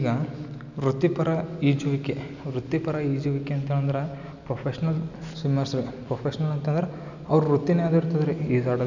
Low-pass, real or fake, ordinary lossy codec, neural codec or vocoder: 7.2 kHz; real; none; none